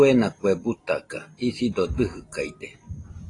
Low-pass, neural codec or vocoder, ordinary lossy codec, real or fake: 10.8 kHz; none; AAC, 32 kbps; real